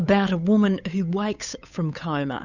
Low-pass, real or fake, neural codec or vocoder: 7.2 kHz; fake; codec, 16 kHz, 8 kbps, FunCodec, trained on Chinese and English, 25 frames a second